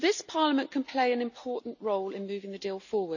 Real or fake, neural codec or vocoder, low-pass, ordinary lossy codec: real; none; 7.2 kHz; none